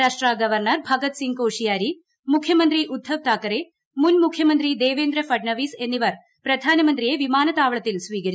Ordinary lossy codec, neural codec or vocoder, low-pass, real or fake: none; none; none; real